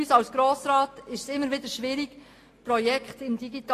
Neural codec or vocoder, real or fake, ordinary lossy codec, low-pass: none; real; AAC, 48 kbps; 14.4 kHz